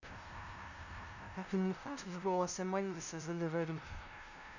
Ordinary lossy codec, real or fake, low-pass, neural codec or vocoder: none; fake; 7.2 kHz; codec, 16 kHz, 0.5 kbps, FunCodec, trained on LibriTTS, 25 frames a second